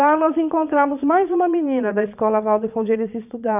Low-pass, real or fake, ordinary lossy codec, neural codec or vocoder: 3.6 kHz; fake; none; vocoder, 22.05 kHz, 80 mel bands, Vocos